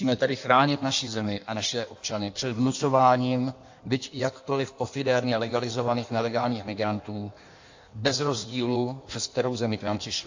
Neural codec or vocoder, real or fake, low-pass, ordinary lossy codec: codec, 16 kHz in and 24 kHz out, 1.1 kbps, FireRedTTS-2 codec; fake; 7.2 kHz; AAC, 48 kbps